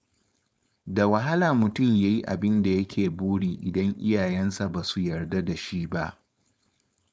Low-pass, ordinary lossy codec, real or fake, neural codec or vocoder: none; none; fake; codec, 16 kHz, 4.8 kbps, FACodec